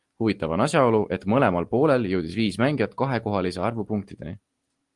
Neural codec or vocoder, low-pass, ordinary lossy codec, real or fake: none; 10.8 kHz; Opus, 24 kbps; real